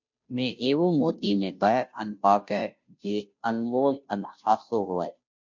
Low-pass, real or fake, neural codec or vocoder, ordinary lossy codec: 7.2 kHz; fake; codec, 16 kHz, 0.5 kbps, FunCodec, trained on Chinese and English, 25 frames a second; MP3, 48 kbps